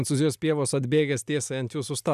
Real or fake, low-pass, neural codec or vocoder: real; 14.4 kHz; none